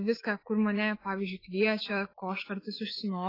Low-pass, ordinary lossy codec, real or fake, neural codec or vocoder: 5.4 kHz; AAC, 24 kbps; fake; autoencoder, 48 kHz, 128 numbers a frame, DAC-VAE, trained on Japanese speech